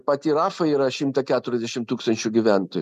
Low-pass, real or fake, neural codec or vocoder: 14.4 kHz; real; none